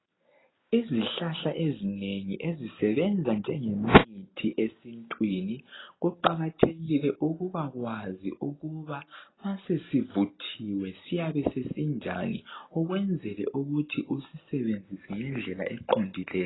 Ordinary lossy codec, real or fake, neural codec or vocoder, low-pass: AAC, 16 kbps; real; none; 7.2 kHz